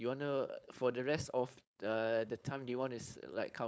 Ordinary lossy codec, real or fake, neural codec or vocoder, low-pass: none; fake; codec, 16 kHz, 4.8 kbps, FACodec; none